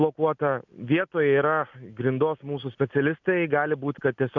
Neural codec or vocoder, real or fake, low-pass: none; real; 7.2 kHz